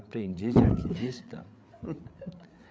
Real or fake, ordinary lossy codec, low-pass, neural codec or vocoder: fake; none; none; codec, 16 kHz, 8 kbps, FreqCodec, larger model